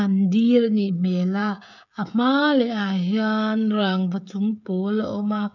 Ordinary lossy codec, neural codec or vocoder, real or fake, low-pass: none; codec, 16 kHz, 16 kbps, FreqCodec, smaller model; fake; 7.2 kHz